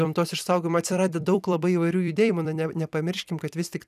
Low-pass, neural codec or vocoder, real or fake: 14.4 kHz; vocoder, 44.1 kHz, 128 mel bands every 256 samples, BigVGAN v2; fake